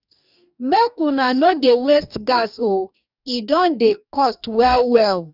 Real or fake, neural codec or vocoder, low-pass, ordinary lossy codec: fake; codec, 44.1 kHz, 2.6 kbps, DAC; 5.4 kHz; none